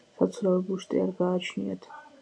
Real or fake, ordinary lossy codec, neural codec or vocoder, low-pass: real; MP3, 48 kbps; none; 9.9 kHz